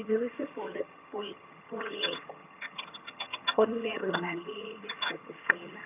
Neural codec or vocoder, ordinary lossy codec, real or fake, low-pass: vocoder, 22.05 kHz, 80 mel bands, HiFi-GAN; none; fake; 3.6 kHz